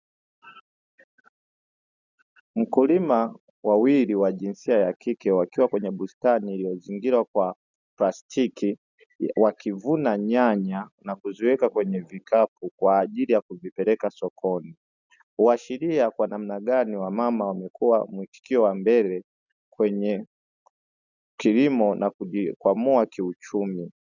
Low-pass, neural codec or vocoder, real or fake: 7.2 kHz; none; real